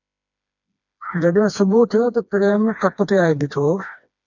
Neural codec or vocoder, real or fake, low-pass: codec, 16 kHz, 2 kbps, FreqCodec, smaller model; fake; 7.2 kHz